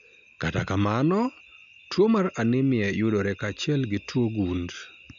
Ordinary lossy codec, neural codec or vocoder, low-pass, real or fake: none; none; 7.2 kHz; real